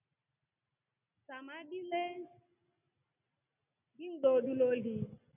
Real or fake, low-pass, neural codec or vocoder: real; 3.6 kHz; none